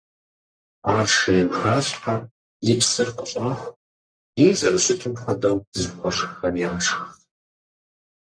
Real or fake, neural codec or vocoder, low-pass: fake; codec, 44.1 kHz, 1.7 kbps, Pupu-Codec; 9.9 kHz